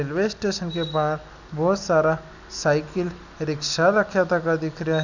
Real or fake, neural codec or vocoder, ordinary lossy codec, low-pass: real; none; none; 7.2 kHz